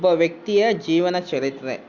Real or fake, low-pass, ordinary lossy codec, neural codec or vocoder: real; 7.2 kHz; Opus, 64 kbps; none